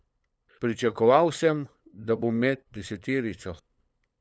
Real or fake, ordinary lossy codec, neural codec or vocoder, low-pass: fake; none; codec, 16 kHz, 8 kbps, FunCodec, trained on LibriTTS, 25 frames a second; none